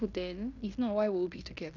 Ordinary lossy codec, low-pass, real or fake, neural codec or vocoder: none; 7.2 kHz; fake; codec, 16 kHz in and 24 kHz out, 0.9 kbps, LongCat-Audio-Codec, fine tuned four codebook decoder